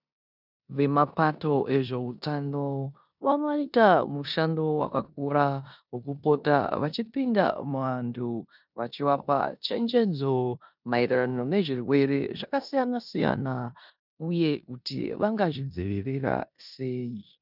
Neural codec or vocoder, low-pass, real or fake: codec, 16 kHz in and 24 kHz out, 0.9 kbps, LongCat-Audio-Codec, four codebook decoder; 5.4 kHz; fake